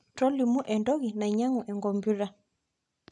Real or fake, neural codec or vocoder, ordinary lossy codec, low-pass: real; none; none; 10.8 kHz